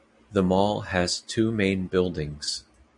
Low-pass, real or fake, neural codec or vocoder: 10.8 kHz; real; none